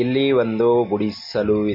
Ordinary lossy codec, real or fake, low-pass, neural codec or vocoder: MP3, 32 kbps; real; 5.4 kHz; none